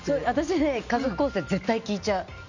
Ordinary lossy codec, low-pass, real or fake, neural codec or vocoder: none; 7.2 kHz; real; none